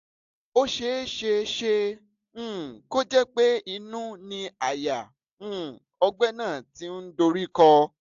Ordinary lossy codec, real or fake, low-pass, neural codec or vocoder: AAC, 64 kbps; real; 7.2 kHz; none